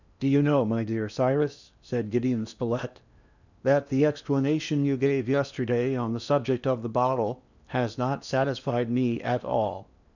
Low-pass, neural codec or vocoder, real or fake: 7.2 kHz; codec, 16 kHz in and 24 kHz out, 0.8 kbps, FocalCodec, streaming, 65536 codes; fake